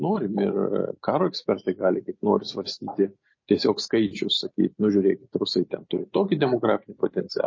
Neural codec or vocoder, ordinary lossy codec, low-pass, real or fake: none; MP3, 32 kbps; 7.2 kHz; real